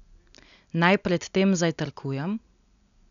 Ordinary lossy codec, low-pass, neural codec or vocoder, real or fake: none; 7.2 kHz; none; real